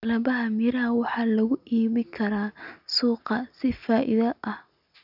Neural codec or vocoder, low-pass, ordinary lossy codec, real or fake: none; 5.4 kHz; none; real